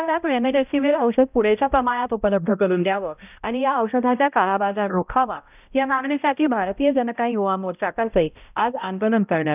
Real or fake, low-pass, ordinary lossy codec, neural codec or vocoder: fake; 3.6 kHz; none; codec, 16 kHz, 0.5 kbps, X-Codec, HuBERT features, trained on balanced general audio